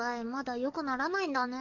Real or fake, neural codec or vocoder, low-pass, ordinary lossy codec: fake; codec, 44.1 kHz, 7.8 kbps, DAC; 7.2 kHz; none